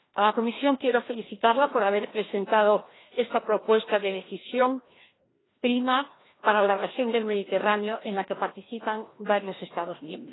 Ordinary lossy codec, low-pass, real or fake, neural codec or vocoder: AAC, 16 kbps; 7.2 kHz; fake; codec, 16 kHz, 1 kbps, FreqCodec, larger model